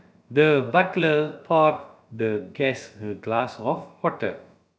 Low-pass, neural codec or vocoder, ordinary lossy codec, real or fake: none; codec, 16 kHz, about 1 kbps, DyCAST, with the encoder's durations; none; fake